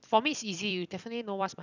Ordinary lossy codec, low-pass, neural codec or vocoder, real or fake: none; 7.2 kHz; vocoder, 44.1 kHz, 128 mel bands every 256 samples, BigVGAN v2; fake